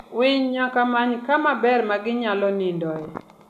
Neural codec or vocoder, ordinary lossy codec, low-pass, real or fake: none; none; 14.4 kHz; real